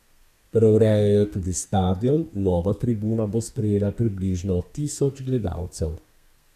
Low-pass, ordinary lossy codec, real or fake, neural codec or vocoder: 14.4 kHz; none; fake; codec, 32 kHz, 1.9 kbps, SNAC